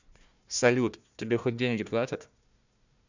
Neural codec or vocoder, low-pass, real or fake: codec, 16 kHz, 1 kbps, FunCodec, trained on Chinese and English, 50 frames a second; 7.2 kHz; fake